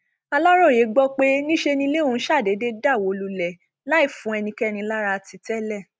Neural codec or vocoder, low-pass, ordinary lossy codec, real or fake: none; none; none; real